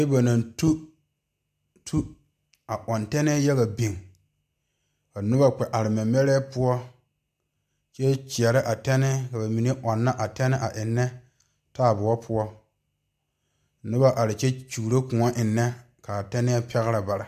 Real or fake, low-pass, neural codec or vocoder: real; 14.4 kHz; none